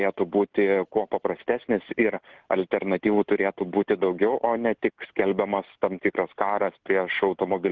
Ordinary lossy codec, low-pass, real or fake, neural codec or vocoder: Opus, 16 kbps; 7.2 kHz; real; none